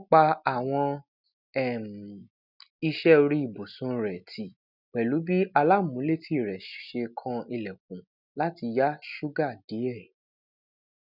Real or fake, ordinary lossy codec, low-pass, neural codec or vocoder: real; none; 5.4 kHz; none